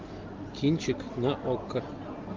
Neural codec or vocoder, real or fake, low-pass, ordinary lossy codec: none; real; 7.2 kHz; Opus, 24 kbps